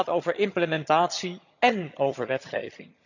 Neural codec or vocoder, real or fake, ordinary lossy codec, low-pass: vocoder, 22.05 kHz, 80 mel bands, HiFi-GAN; fake; none; 7.2 kHz